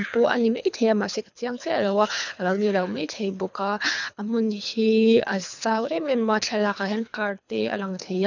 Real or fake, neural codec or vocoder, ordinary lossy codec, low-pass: fake; codec, 24 kHz, 3 kbps, HILCodec; none; 7.2 kHz